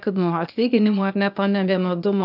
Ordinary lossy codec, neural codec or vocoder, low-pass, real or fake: AAC, 48 kbps; codec, 16 kHz, 0.8 kbps, ZipCodec; 5.4 kHz; fake